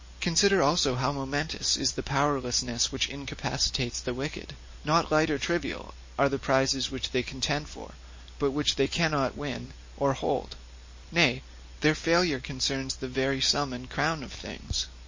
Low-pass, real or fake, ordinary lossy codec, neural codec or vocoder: 7.2 kHz; real; MP3, 32 kbps; none